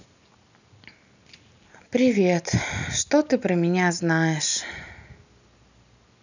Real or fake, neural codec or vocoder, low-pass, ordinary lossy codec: real; none; 7.2 kHz; none